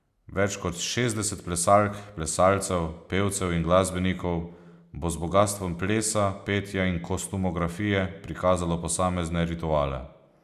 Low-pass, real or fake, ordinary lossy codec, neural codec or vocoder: 14.4 kHz; real; none; none